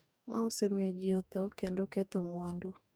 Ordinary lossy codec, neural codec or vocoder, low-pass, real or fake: none; codec, 44.1 kHz, 2.6 kbps, DAC; none; fake